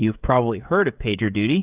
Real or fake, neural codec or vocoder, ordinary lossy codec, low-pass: fake; codec, 16 kHz, 16 kbps, FreqCodec, smaller model; Opus, 64 kbps; 3.6 kHz